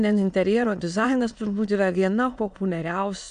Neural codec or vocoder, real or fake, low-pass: autoencoder, 22.05 kHz, a latent of 192 numbers a frame, VITS, trained on many speakers; fake; 9.9 kHz